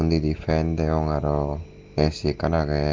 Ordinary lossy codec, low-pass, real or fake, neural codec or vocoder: Opus, 16 kbps; 7.2 kHz; real; none